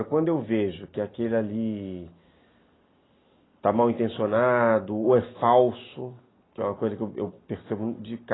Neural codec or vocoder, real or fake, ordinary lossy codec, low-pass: none; real; AAC, 16 kbps; 7.2 kHz